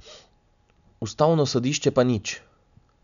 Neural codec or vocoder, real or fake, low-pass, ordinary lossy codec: none; real; 7.2 kHz; none